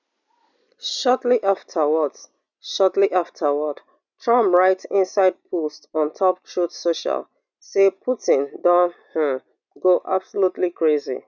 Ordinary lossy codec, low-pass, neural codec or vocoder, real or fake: none; 7.2 kHz; none; real